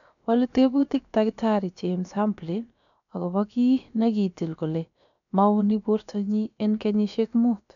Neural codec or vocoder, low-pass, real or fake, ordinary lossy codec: codec, 16 kHz, about 1 kbps, DyCAST, with the encoder's durations; 7.2 kHz; fake; none